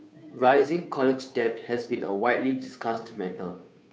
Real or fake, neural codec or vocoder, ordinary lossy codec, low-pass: fake; codec, 16 kHz, 2 kbps, FunCodec, trained on Chinese and English, 25 frames a second; none; none